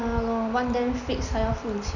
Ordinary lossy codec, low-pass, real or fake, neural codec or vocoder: none; 7.2 kHz; real; none